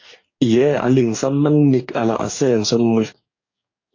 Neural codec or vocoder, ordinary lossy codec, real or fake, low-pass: codec, 44.1 kHz, 2.6 kbps, DAC; AAC, 48 kbps; fake; 7.2 kHz